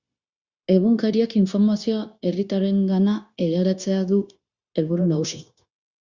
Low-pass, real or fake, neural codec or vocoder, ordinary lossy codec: 7.2 kHz; fake; codec, 16 kHz, 0.9 kbps, LongCat-Audio-Codec; Opus, 64 kbps